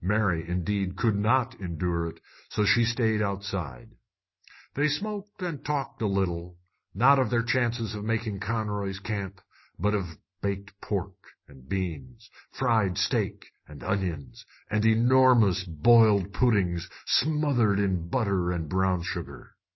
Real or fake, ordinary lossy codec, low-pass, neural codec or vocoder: real; MP3, 24 kbps; 7.2 kHz; none